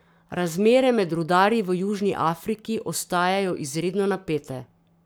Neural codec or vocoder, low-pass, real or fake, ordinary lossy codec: codec, 44.1 kHz, 7.8 kbps, Pupu-Codec; none; fake; none